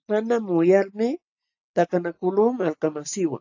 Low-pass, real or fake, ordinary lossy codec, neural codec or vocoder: 7.2 kHz; real; AAC, 48 kbps; none